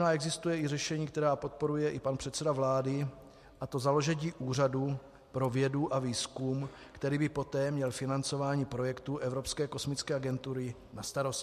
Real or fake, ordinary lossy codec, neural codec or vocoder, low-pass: real; MP3, 64 kbps; none; 14.4 kHz